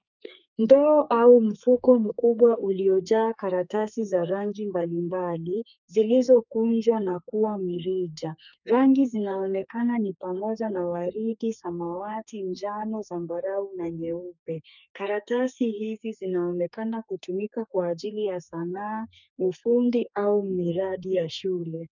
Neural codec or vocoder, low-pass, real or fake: codec, 32 kHz, 1.9 kbps, SNAC; 7.2 kHz; fake